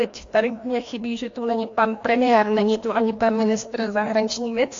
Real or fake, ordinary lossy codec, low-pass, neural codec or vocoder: fake; AAC, 48 kbps; 7.2 kHz; codec, 16 kHz, 1 kbps, FreqCodec, larger model